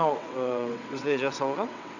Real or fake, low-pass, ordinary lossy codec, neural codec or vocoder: fake; 7.2 kHz; none; vocoder, 22.05 kHz, 80 mel bands, WaveNeXt